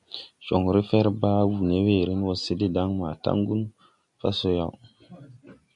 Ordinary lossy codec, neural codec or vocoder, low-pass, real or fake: MP3, 96 kbps; none; 10.8 kHz; real